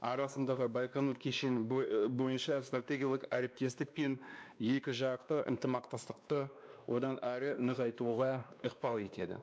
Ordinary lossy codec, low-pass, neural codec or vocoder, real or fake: none; none; codec, 16 kHz, 2 kbps, X-Codec, WavLM features, trained on Multilingual LibriSpeech; fake